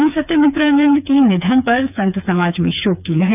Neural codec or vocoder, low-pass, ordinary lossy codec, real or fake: codec, 16 kHz, 4 kbps, FreqCodec, smaller model; 3.6 kHz; none; fake